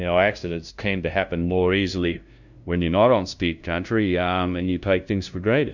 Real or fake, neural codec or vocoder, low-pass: fake; codec, 16 kHz, 0.5 kbps, FunCodec, trained on LibriTTS, 25 frames a second; 7.2 kHz